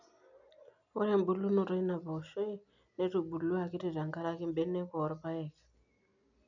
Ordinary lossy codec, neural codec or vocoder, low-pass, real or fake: none; none; 7.2 kHz; real